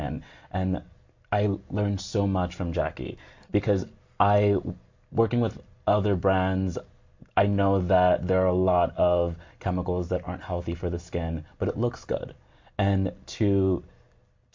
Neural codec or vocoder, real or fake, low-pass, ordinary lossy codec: none; real; 7.2 kHz; MP3, 48 kbps